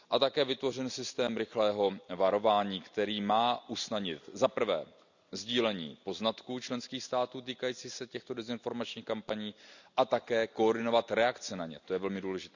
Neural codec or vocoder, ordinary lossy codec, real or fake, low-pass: none; none; real; 7.2 kHz